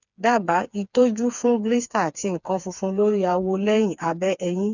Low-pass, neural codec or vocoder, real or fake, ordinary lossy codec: 7.2 kHz; codec, 16 kHz, 4 kbps, FreqCodec, smaller model; fake; none